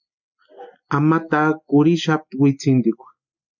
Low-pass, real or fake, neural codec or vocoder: 7.2 kHz; real; none